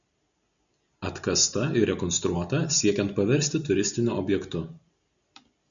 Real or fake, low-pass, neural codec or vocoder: real; 7.2 kHz; none